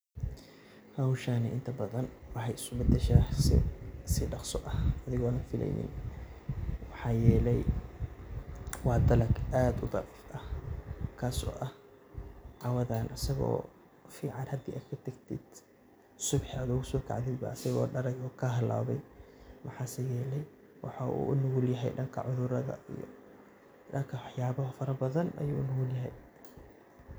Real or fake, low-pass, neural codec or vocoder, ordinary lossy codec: real; none; none; none